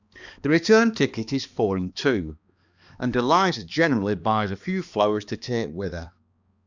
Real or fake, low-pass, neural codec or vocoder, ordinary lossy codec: fake; 7.2 kHz; codec, 16 kHz, 2 kbps, X-Codec, HuBERT features, trained on balanced general audio; Opus, 64 kbps